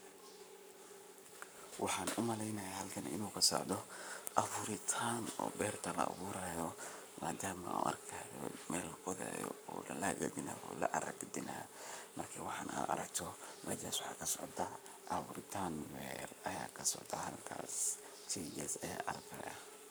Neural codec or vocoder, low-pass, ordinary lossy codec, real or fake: codec, 44.1 kHz, 7.8 kbps, Pupu-Codec; none; none; fake